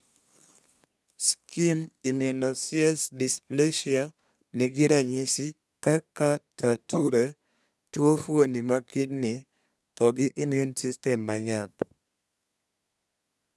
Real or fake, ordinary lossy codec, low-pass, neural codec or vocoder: fake; none; none; codec, 24 kHz, 1 kbps, SNAC